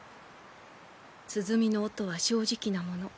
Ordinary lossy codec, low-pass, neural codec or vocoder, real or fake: none; none; none; real